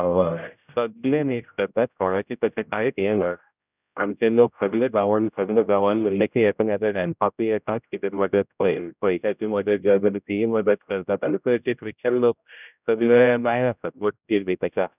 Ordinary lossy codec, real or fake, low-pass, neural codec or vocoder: none; fake; 3.6 kHz; codec, 16 kHz, 0.5 kbps, X-Codec, HuBERT features, trained on general audio